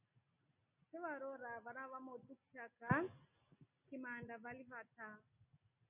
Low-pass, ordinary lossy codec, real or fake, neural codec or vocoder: 3.6 kHz; MP3, 32 kbps; real; none